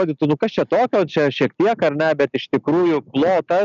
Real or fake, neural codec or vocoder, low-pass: real; none; 7.2 kHz